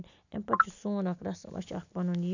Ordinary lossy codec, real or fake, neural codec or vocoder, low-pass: none; real; none; 7.2 kHz